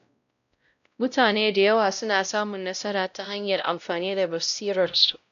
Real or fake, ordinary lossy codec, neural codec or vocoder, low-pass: fake; none; codec, 16 kHz, 0.5 kbps, X-Codec, WavLM features, trained on Multilingual LibriSpeech; 7.2 kHz